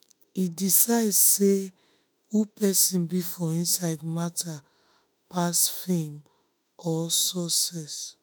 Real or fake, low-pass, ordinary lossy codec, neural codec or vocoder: fake; none; none; autoencoder, 48 kHz, 32 numbers a frame, DAC-VAE, trained on Japanese speech